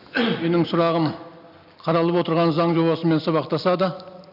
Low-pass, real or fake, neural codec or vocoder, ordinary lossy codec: 5.4 kHz; real; none; none